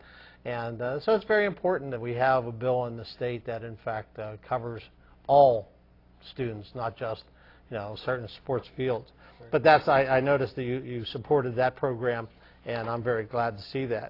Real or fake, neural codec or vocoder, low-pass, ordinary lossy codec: real; none; 5.4 kHz; AAC, 32 kbps